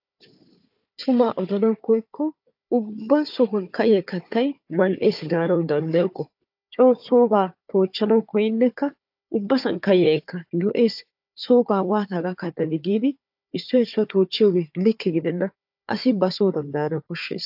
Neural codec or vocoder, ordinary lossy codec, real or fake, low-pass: codec, 16 kHz, 4 kbps, FunCodec, trained on Chinese and English, 50 frames a second; AAC, 48 kbps; fake; 5.4 kHz